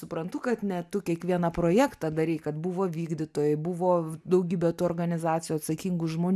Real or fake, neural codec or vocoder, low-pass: real; none; 14.4 kHz